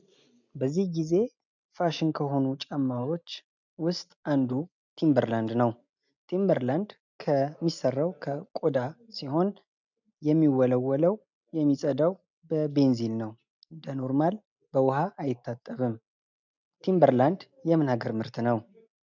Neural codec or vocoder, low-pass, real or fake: none; 7.2 kHz; real